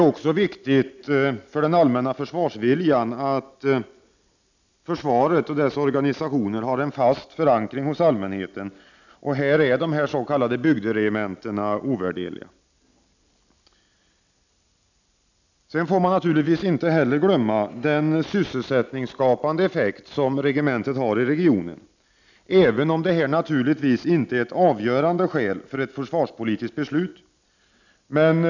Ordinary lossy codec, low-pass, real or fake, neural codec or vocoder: none; 7.2 kHz; real; none